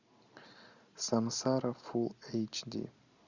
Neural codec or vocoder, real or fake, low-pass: none; real; 7.2 kHz